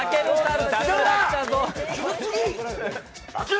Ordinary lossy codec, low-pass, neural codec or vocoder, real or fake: none; none; none; real